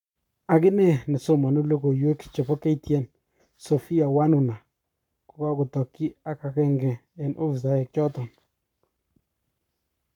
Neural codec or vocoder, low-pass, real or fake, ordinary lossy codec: codec, 44.1 kHz, 7.8 kbps, Pupu-Codec; 19.8 kHz; fake; none